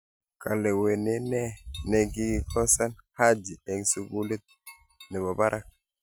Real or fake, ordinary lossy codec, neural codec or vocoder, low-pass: real; none; none; none